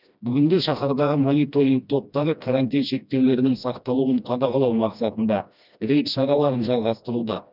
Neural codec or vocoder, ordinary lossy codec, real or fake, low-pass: codec, 16 kHz, 1 kbps, FreqCodec, smaller model; none; fake; 5.4 kHz